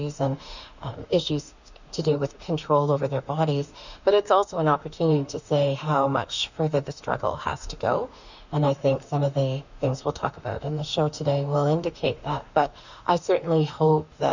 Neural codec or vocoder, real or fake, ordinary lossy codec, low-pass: autoencoder, 48 kHz, 32 numbers a frame, DAC-VAE, trained on Japanese speech; fake; Opus, 64 kbps; 7.2 kHz